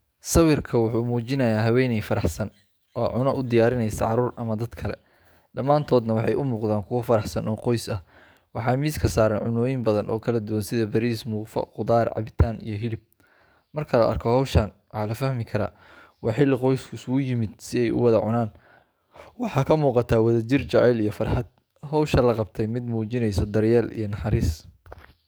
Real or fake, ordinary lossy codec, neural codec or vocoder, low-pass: fake; none; codec, 44.1 kHz, 7.8 kbps, DAC; none